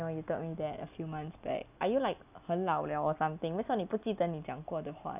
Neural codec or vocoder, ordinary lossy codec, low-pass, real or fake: none; none; 3.6 kHz; real